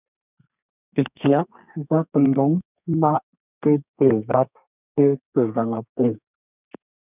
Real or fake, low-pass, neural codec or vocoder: fake; 3.6 kHz; codec, 32 kHz, 1.9 kbps, SNAC